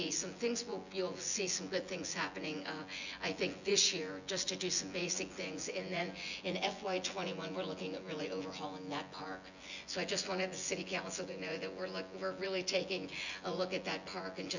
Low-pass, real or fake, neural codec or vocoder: 7.2 kHz; fake; vocoder, 24 kHz, 100 mel bands, Vocos